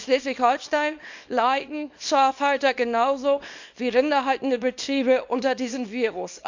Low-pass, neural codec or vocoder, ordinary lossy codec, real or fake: 7.2 kHz; codec, 24 kHz, 0.9 kbps, WavTokenizer, small release; MP3, 64 kbps; fake